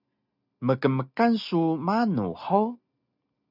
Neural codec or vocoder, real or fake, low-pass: none; real; 5.4 kHz